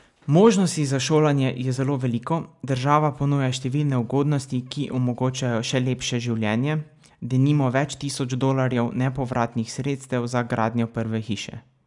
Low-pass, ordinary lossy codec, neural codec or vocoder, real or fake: 10.8 kHz; none; none; real